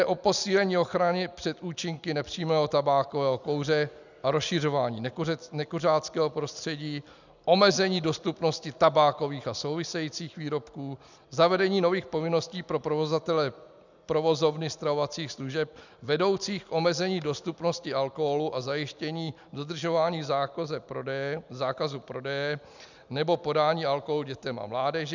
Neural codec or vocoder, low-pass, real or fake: none; 7.2 kHz; real